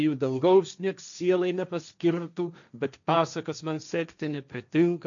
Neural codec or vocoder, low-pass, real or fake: codec, 16 kHz, 1.1 kbps, Voila-Tokenizer; 7.2 kHz; fake